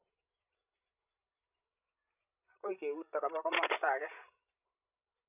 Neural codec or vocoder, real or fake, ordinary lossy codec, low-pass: codec, 16 kHz, 16 kbps, FreqCodec, larger model; fake; none; 3.6 kHz